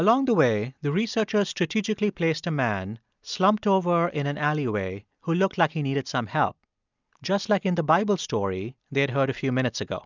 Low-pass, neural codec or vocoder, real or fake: 7.2 kHz; none; real